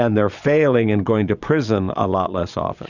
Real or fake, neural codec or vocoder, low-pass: fake; vocoder, 22.05 kHz, 80 mel bands, Vocos; 7.2 kHz